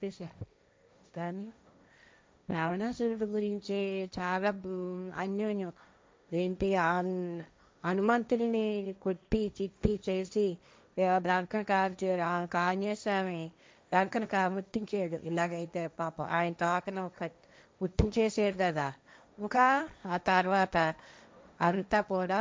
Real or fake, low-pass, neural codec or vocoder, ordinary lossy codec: fake; none; codec, 16 kHz, 1.1 kbps, Voila-Tokenizer; none